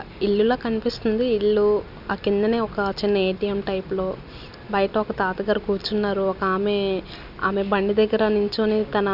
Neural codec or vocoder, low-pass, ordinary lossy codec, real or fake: none; 5.4 kHz; none; real